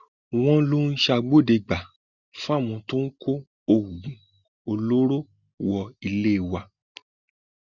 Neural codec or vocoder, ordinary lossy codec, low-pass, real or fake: none; Opus, 64 kbps; 7.2 kHz; real